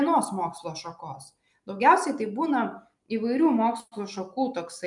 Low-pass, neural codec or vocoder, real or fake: 10.8 kHz; none; real